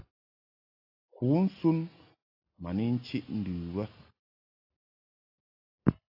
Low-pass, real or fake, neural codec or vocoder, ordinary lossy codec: 5.4 kHz; real; none; AAC, 32 kbps